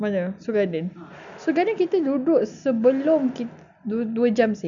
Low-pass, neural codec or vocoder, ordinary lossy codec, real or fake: 7.2 kHz; none; none; real